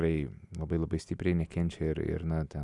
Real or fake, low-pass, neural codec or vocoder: real; 10.8 kHz; none